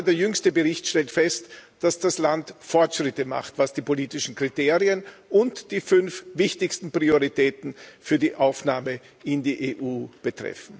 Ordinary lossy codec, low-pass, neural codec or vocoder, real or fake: none; none; none; real